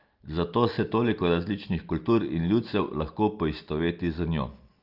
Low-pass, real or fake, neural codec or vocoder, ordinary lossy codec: 5.4 kHz; real; none; Opus, 24 kbps